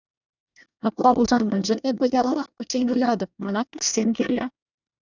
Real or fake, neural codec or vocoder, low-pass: fake; codec, 24 kHz, 1 kbps, SNAC; 7.2 kHz